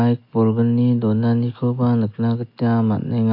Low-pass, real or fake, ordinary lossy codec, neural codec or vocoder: 5.4 kHz; real; MP3, 32 kbps; none